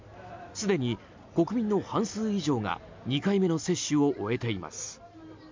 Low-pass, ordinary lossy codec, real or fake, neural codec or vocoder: 7.2 kHz; MP3, 64 kbps; real; none